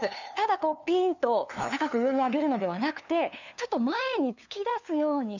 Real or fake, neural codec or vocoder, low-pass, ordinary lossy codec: fake; codec, 16 kHz, 2 kbps, FunCodec, trained on LibriTTS, 25 frames a second; 7.2 kHz; none